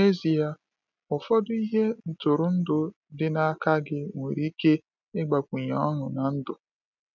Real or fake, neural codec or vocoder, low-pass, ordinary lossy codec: real; none; 7.2 kHz; none